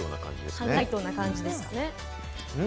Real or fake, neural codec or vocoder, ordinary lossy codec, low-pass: real; none; none; none